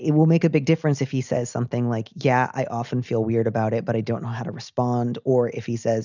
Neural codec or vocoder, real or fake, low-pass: none; real; 7.2 kHz